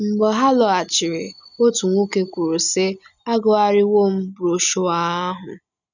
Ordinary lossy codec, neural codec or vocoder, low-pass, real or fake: none; none; 7.2 kHz; real